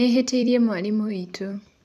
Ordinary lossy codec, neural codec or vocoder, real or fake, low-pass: none; vocoder, 44.1 kHz, 128 mel bands every 256 samples, BigVGAN v2; fake; 14.4 kHz